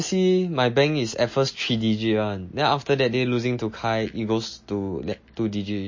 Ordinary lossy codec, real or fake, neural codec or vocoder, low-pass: MP3, 32 kbps; real; none; 7.2 kHz